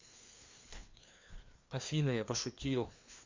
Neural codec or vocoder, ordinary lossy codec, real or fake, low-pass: codec, 16 kHz, 2 kbps, FreqCodec, larger model; MP3, 64 kbps; fake; 7.2 kHz